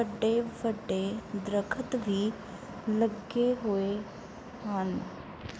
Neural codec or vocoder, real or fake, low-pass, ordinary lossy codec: none; real; none; none